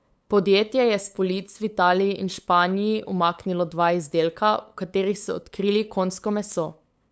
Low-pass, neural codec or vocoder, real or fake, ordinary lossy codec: none; codec, 16 kHz, 8 kbps, FunCodec, trained on LibriTTS, 25 frames a second; fake; none